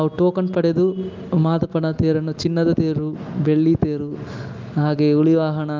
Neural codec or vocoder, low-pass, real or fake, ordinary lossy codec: none; 7.2 kHz; real; Opus, 24 kbps